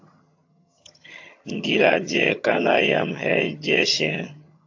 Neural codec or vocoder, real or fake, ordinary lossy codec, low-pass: vocoder, 22.05 kHz, 80 mel bands, HiFi-GAN; fake; AAC, 48 kbps; 7.2 kHz